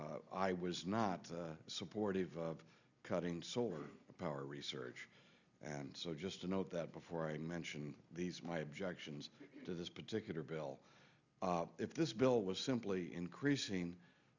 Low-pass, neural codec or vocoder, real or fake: 7.2 kHz; none; real